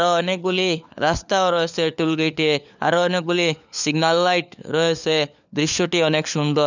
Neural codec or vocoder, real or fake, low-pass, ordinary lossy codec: codec, 16 kHz, 4 kbps, FunCodec, trained on LibriTTS, 50 frames a second; fake; 7.2 kHz; none